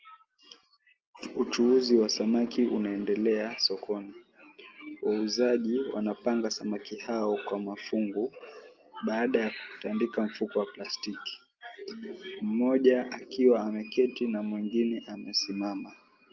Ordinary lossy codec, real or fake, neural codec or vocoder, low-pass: Opus, 24 kbps; real; none; 7.2 kHz